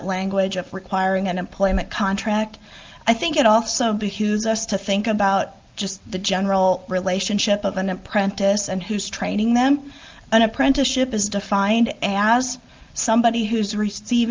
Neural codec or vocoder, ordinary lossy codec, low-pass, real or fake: none; Opus, 24 kbps; 7.2 kHz; real